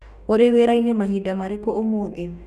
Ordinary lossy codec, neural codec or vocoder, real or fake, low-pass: none; codec, 44.1 kHz, 2.6 kbps, DAC; fake; 14.4 kHz